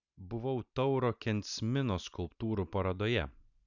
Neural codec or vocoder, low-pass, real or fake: vocoder, 44.1 kHz, 128 mel bands every 512 samples, BigVGAN v2; 7.2 kHz; fake